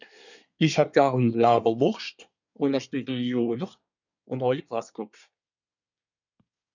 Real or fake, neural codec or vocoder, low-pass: fake; codec, 24 kHz, 1 kbps, SNAC; 7.2 kHz